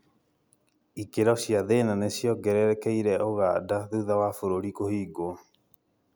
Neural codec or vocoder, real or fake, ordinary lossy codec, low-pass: none; real; none; none